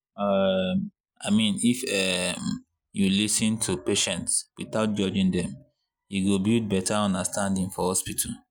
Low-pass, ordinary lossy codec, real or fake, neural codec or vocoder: none; none; real; none